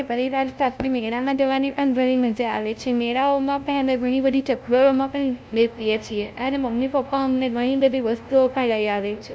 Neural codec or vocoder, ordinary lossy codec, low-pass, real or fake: codec, 16 kHz, 0.5 kbps, FunCodec, trained on LibriTTS, 25 frames a second; none; none; fake